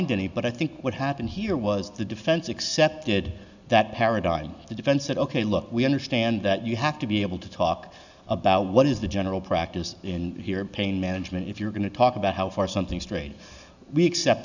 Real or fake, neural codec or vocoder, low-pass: real; none; 7.2 kHz